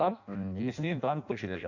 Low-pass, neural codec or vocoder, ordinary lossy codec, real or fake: 7.2 kHz; codec, 16 kHz in and 24 kHz out, 0.6 kbps, FireRedTTS-2 codec; none; fake